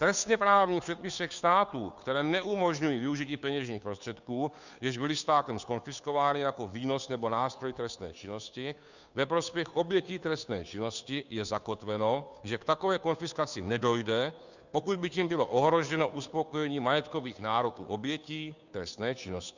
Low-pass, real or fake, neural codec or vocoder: 7.2 kHz; fake; codec, 16 kHz, 2 kbps, FunCodec, trained on Chinese and English, 25 frames a second